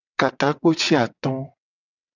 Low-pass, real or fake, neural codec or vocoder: 7.2 kHz; fake; vocoder, 22.05 kHz, 80 mel bands, WaveNeXt